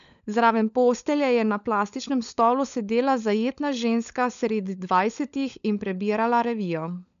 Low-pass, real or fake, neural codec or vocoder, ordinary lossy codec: 7.2 kHz; fake; codec, 16 kHz, 16 kbps, FunCodec, trained on LibriTTS, 50 frames a second; none